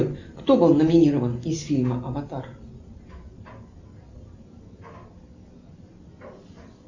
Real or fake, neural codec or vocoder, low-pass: real; none; 7.2 kHz